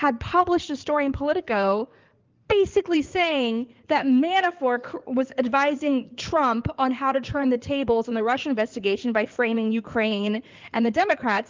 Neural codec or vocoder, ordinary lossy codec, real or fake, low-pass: codec, 16 kHz in and 24 kHz out, 2.2 kbps, FireRedTTS-2 codec; Opus, 24 kbps; fake; 7.2 kHz